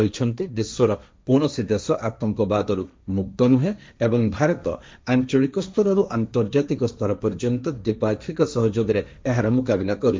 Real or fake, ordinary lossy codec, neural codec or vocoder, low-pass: fake; none; codec, 16 kHz, 1.1 kbps, Voila-Tokenizer; none